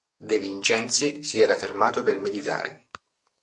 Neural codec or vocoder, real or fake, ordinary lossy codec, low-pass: codec, 44.1 kHz, 2.6 kbps, SNAC; fake; MP3, 48 kbps; 10.8 kHz